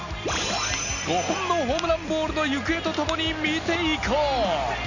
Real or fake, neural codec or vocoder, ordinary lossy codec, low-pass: real; none; none; 7.2 kHz